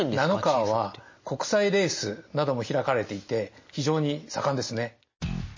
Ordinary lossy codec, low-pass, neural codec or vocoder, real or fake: MP3, 32 kbps; 7.2 kHz; none; real